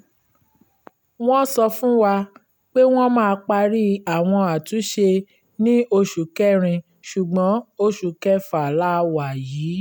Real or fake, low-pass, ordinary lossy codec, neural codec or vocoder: real; none; none; none